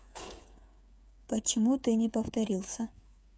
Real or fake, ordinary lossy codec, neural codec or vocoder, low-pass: fake; none; codec, 16 kHz, 8 kbps, FreqCodec, smaller model; none